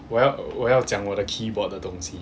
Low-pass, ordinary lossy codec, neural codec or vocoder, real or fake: none; none; none; real